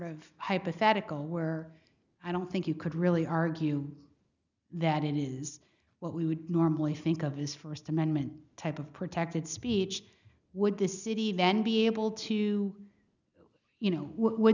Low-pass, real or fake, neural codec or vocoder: 7.2 kHz; real; none